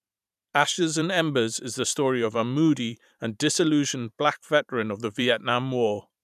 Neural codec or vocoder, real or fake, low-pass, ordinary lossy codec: vocoder, 44.1 kHz, 128 mel bands every 512 samples, BigVGAN v2; fake; 14.4 kHz; none